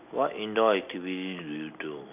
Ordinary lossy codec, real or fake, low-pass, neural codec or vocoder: none; real; 3.6 kHz; none